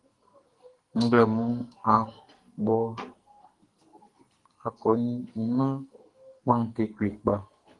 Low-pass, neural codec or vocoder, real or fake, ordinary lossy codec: 10.8 kHz; codec, 44.1 kHz, 2.6 kbps, SNAC; fake; Opus, 24 kbps